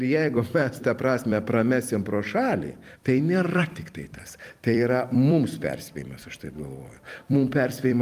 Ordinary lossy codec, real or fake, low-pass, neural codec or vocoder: Opus, 32 kbps; fake; 14.4 kHz; vocoder, 44.1 kHz, 128 mel bands every 256 samples, BigVGAN v2